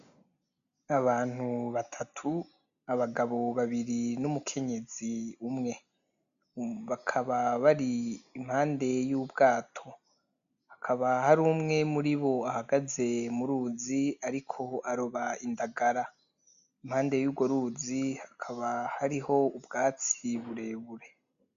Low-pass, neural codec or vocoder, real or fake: 7.2 kHz; none; real